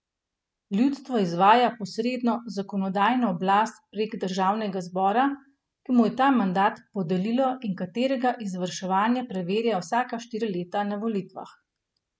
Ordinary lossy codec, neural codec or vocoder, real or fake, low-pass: none; none; real; none